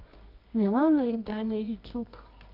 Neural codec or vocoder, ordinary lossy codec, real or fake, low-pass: codec, 24 kHz, 0.9 kbps, WavTokenizer, medium music audio release; none; fake; 5.4 kHz